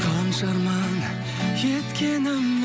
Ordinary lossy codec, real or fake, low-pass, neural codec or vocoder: none; real; none; none